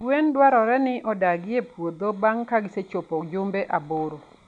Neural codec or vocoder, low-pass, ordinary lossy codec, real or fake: none; 9.9 kHz; none; real